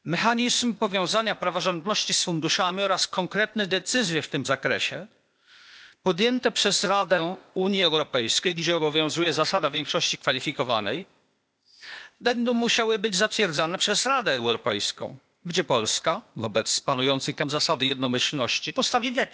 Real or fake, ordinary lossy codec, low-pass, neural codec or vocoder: fake; none; none; codec, 16 kHz, 0.8 kbps, ZipCodec